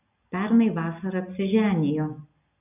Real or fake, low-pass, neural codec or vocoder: real; 3.6 kHz; none